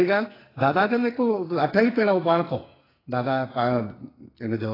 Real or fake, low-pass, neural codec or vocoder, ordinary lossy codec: fake; 5.4 kHz; codec, 44.1 kHz, 2.6 kbps, SNAC; AAC, 24 kbps